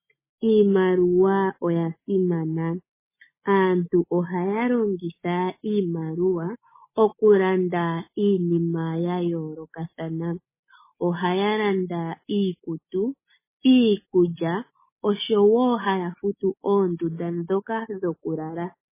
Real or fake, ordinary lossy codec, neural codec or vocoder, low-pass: real; MP3, 16 kbps; none; 3.6 kHz